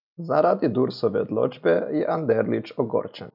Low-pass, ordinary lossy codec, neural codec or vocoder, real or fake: 5.4 kHz; none; none; real